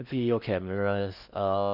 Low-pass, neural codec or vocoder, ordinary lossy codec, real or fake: 5.4 kHz; codec, 16 kHz in and 24 kHz out, 0.6 kbps, FocalCodec, streaming, 4096 codes; none; fake